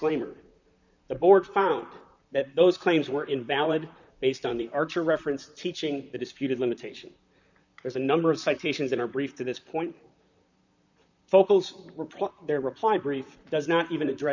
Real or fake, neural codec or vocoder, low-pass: fake; vocoder, 44.1 kHz, 128 mel bands, Pupu-Vocoder; 7.2 kHz